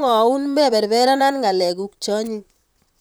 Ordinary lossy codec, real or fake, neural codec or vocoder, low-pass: none; fake; vocoder, 44.1 kHz, 128 mel bands, Pupu-Vocoder; none